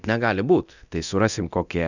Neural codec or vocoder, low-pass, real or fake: codec, 24 kHz, 0.9 kbps, DualCodec; 7.2 kHz; fake